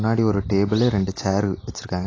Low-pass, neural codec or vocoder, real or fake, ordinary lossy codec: 7.2 kHz; none; real; AAC, 32 kbps